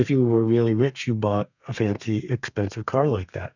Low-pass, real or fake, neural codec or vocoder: 7.2 kHz; fake; codec, 32 kHz, 1.9 kbps, SNAC